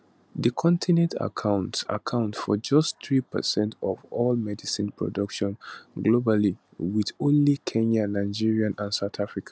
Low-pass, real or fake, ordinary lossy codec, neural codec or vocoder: none; real; none; none